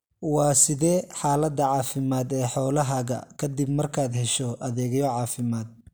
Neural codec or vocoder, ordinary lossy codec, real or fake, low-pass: none; none; real; none